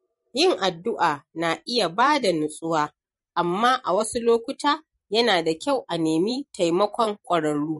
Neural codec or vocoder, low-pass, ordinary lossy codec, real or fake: vocoder, 44.1 kHz, 128 mel bands every 512 samples, BigVGAN v2; 19.8 kHz; MP3, 48 kbps; fake